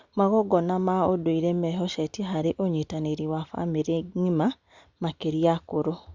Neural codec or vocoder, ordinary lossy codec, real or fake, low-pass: none; Opus, 64 kbps; real; 7.2 kHz